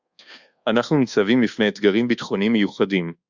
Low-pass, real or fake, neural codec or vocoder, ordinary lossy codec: 7.2 kHz; fake; codec, 24 kHz, 1.2 kbps, DualCodec; Opus, 64 kbps